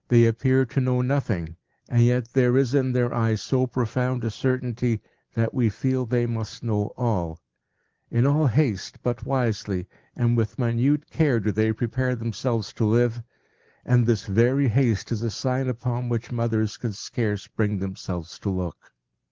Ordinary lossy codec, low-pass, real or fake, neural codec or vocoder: Opus, 16 kbps; 7.2 kHz; real; none